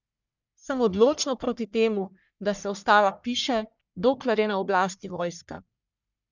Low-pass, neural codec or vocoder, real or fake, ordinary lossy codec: 7.2 kHz; codec, 44.1 kHz, 1.7 kbps, Pupu-Codec; fake; none